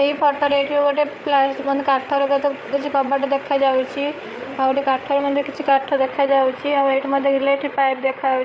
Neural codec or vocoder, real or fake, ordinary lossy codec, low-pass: codec, 16 kHz, 8 kbps, FreqCodec, larger model; fake; none; none